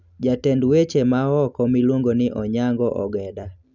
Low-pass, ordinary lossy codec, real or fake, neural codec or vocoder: 7.2 kHz; none; real; none